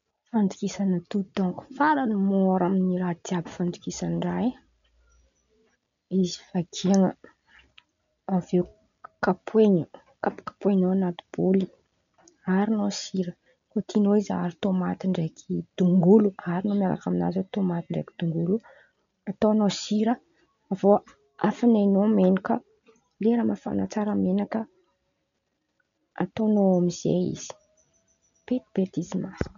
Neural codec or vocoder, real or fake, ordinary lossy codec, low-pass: none; real; none; 7.2 kHz